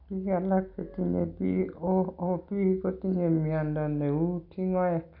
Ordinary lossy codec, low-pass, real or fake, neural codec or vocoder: AAC, 48 kbps; 5.4 kHz; real; none